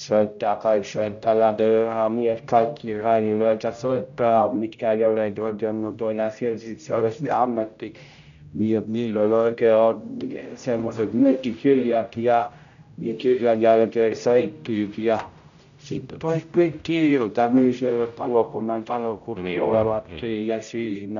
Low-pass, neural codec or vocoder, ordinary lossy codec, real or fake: 7.2 kHz; codec, 16 kHz, 0.5 kbps, X-Codec, HuBERT features, trained on general audio; none; fake